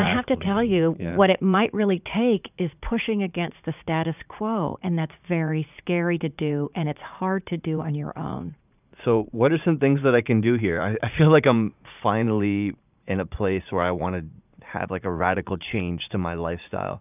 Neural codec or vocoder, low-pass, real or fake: vocoder, 44.1 kHz, 80 mel bands, Vocos; 3.6 kHz; fake